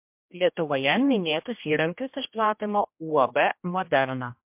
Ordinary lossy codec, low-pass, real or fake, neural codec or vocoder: MP3, 32 kbps; 3.6 kHz; fake; codec, 16 kHz, 1 kbps, X-Codec, HuBERT features, trained on general audio